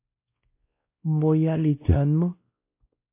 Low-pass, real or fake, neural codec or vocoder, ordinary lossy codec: 3.6 kHz; fake; codec, 16 kHz, 1 kbps, X-Codec, WavLM features, trained on Multilingual LibriSpeech; MP3, 24 kbps